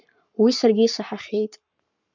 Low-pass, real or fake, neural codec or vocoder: 7.2 kHz; fake; codec, 44.1 kHz, 7.8 kbps, Pupu-Codec